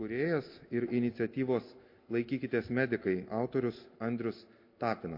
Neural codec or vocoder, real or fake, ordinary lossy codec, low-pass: none; real; MP3, 32 kbps; 5.4 kHz